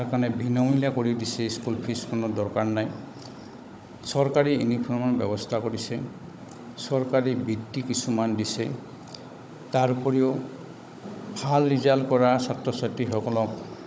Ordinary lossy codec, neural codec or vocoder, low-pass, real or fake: none; codec, 16 kHz, 16 kbps, FunCodec, trained on Chinese and English, 50 frames a second; none; fake